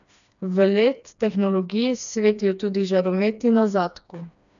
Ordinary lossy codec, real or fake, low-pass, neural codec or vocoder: none; fake; 7.2 kHz; codec, 16 kHz, 2 kbps, FreqCodec, smaller model